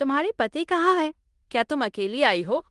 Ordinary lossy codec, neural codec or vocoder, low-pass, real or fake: Opus, 24 kbps; codec, 16 kHz in and 24 kHz out, 0.9 kbps, LongCat-Audio-Codec, fine tuned four codebook decoder; 10.8 kHz; fake